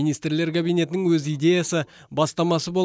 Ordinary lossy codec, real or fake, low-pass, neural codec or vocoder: none; real; none; none